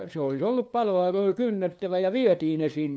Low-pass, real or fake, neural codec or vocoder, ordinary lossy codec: none; fake; codec, 16 kHz, 2 kbps, FunCodec, trained on LibriTTS, 25 frames a second; none